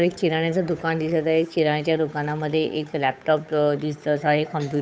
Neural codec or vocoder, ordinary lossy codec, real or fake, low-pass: codec, 16 kHz, 8 kbps, FunCodec, trained on Chinese and English, 25 frames a second; none; fake; none